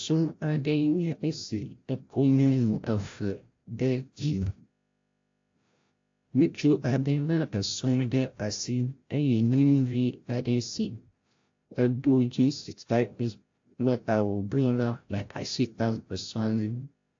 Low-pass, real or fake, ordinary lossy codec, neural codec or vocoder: 7.2 kHz; fake; AAC, 48 kbps; codec, 16 kHz, 0.5 kbps, FreqCodec, larger model